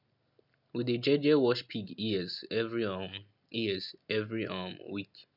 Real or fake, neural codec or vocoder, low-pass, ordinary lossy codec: real; none; 5.4 kHz; none